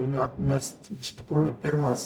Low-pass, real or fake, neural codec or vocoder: 19.8 kHz; fake; codec, 44.1 kHz, 0.9 kbps, DAC